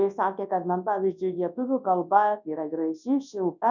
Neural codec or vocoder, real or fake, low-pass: codec, 24 kHz, 0.9 kbps, WavTokenizer, large speech release; fake; 7.2 kHz